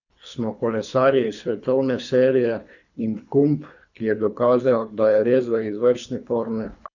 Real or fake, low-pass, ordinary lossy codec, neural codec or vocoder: fake; 7.2 kHz; none; codec, 24 kHz, 3 kbps, HILCodec